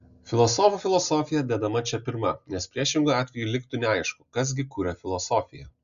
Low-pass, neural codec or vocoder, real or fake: 7.2 kHz; none; real